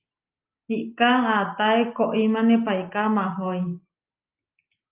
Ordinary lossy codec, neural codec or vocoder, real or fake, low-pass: Opus, 24 kbps; none; real; 3.6 kHz